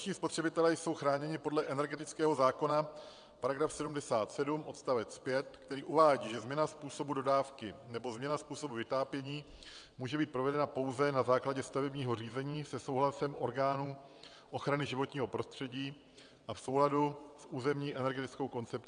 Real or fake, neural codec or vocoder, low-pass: fake; vocoder, 22.05 kHz, 80 mel bands, WaveNeXt; 9.9 kHz